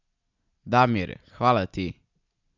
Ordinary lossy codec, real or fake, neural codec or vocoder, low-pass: none; real; none; 7.2 kHz